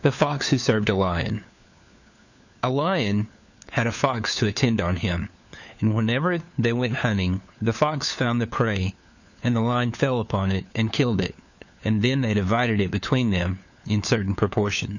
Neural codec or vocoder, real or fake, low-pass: codec, 16 kHz, 4 kbps, FunCodec, trained on LibriTTS, 50 frames a second; fake; 7.2 kHz